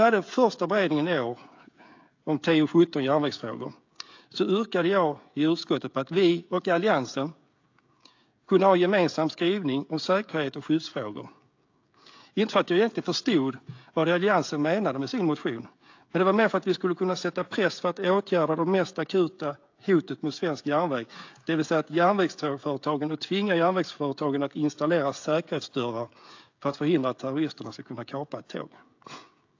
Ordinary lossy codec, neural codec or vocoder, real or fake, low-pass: AAC, 48 kbps; codec, 16 kHz, 16 kbps, FreqCodec, smaller model; fake; 7.2 kHz